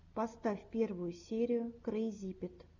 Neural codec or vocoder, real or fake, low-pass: none; real; 7.2 kHz